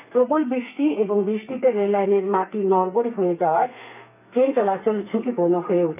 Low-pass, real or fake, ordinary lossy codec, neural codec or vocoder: 3.6 kHz; fake; none; codec, 32 kHz, 1.9 kbps, SNAC